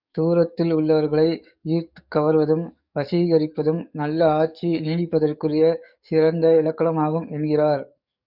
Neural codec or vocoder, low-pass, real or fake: codec, 44.1 kHz, 7.8 kbps, DAC; 5.4 kHz; fake